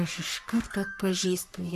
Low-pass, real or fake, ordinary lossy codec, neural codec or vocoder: 14.4 kHz; fake; MP3, 96 kbps; codec, 44.1 kHz, 3.4 kbps, Pupu-Codec